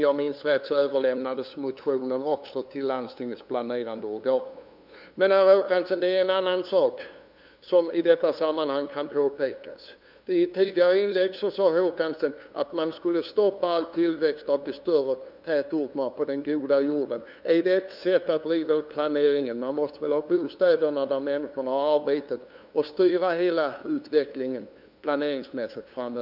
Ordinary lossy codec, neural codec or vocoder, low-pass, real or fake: none; codec, 16 kHz, 2 kbps, FunCodec, trained on LibriTTS, 25 frames a second; 5.4 kHz; fake